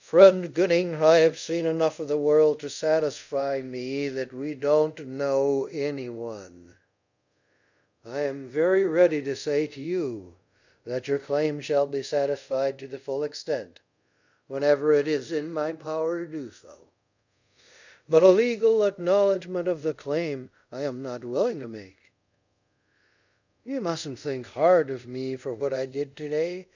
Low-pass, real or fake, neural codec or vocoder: 7.2 kHz; fake; codec, 24 kHz, 0.5 kbps, DualCodec